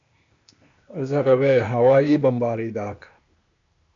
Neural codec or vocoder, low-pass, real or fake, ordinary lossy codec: codec, 16 kHz, 0.8 kbps, ZipCodec; 7.2 kHz; fake; MP3, 64 kbps